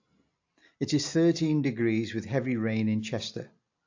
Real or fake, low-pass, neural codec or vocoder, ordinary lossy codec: real; 7.2 kHz; none; AAC, 48 kbps